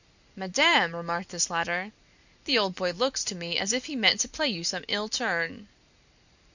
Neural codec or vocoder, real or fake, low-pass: none; real; 7.2 kHz